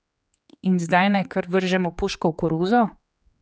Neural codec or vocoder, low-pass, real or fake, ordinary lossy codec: codec, 16 kHz, 4 kbps, X-Codec, HuBERT features, trained on general audio; none; fake; none